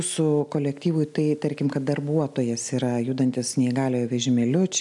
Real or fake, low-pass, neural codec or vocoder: real; 10.8 kHz; none